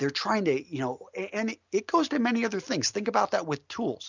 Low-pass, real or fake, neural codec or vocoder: 7.2 kHz; real; none